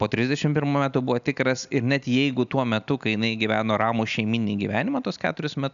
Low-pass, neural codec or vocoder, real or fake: 7.2 kHz; none; real